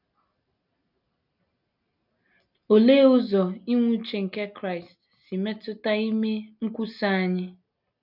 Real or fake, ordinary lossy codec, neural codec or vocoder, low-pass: real; none; none; 5.4 kHz